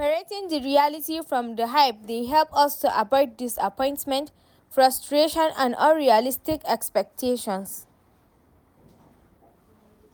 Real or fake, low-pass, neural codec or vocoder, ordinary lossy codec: real; none; none; none